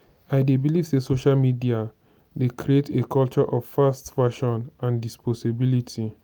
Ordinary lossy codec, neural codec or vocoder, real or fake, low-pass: none; none; real; none